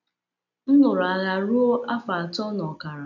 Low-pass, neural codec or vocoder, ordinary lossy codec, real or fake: 7.2 kHz; none; none; real